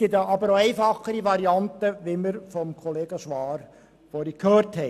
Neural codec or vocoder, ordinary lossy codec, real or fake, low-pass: none; none; real; 14.4 kHz